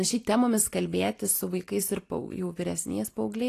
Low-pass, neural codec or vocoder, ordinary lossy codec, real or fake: 14.4 kHz; none; AAC, 48 kbps; real